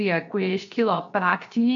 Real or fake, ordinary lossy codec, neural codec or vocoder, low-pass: fake; MP3, 48 kbps; codec, 16 kHz, 0.7 kbps, FocalCodec; 7.2 kHz